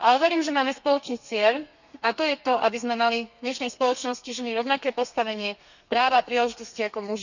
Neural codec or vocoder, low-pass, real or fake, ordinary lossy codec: codec, 32 kHz, 1.9 kbps, SNAC; 7.2 kHz; fake; none